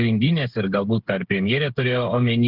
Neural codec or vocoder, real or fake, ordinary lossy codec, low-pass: codec, 16 kHz, 8 kbps, FreqCodec, smaller model; fake; Opus, 16 kbps; 5.4 kHz